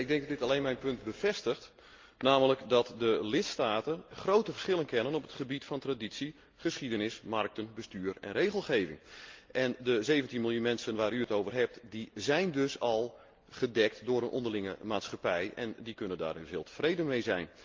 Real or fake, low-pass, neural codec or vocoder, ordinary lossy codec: real; 7.2 kHz; none; Opus, 24 kbps